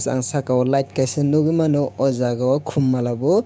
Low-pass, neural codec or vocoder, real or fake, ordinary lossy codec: none; codec, 16 kHz, 6 kbps, DAC; fake; none